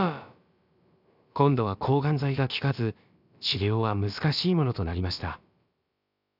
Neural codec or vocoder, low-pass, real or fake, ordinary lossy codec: codec, 16 kHz, about 1 kbps, DyCAST, with the encoder's durations; 5.4 kHz; fake; none